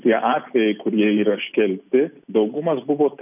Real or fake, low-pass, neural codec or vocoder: real; 3.6 kHz; none